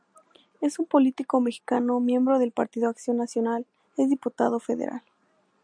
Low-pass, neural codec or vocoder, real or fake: 9.9 kHz; none; real